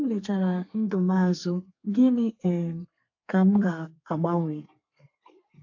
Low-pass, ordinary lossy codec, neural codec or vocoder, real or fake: 7.2 kHz; AAC, 48 kbps; codec, 32 kHz, 1.9 kbps, SNAC; fake